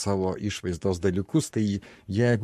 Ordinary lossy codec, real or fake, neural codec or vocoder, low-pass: MP3, 64 kbps; fake; codec, 44.1 kHz, 7.8 kbps, Pupu-Codec; 14.4 kHz